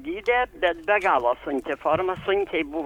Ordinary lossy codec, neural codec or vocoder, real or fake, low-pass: MP3, 96 kbps; codec, 44.1 kHz, 7.8 kbps, Pupu-Codec; fake; 19.8 kHz